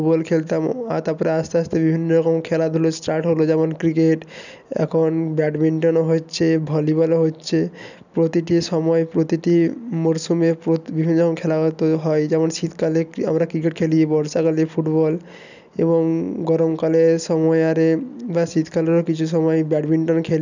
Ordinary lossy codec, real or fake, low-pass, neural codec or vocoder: none; real; 7.2 kHz; none